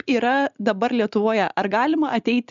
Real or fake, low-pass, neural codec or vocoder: real; 7.2 kHz; none